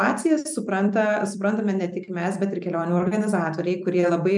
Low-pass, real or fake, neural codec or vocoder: 10.8 kHz; fake; vocoder, 44.1 kHz, 128 mel bands every 256 samples, BigVGAN v2